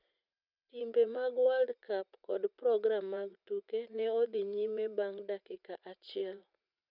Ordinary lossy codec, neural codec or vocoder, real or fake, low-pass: none; vocoder, 44.1 kHz, 80 mel bands, Vocos; fake; 5.4 kHz